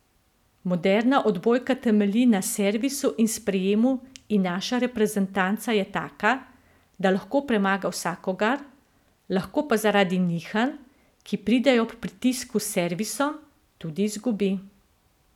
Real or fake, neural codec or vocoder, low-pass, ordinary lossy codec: real; none; 19.8 kHz; none